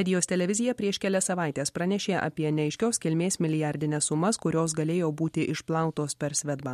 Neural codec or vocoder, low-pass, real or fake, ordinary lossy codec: none; 14.4 kHz; real; MP3, 64 kbps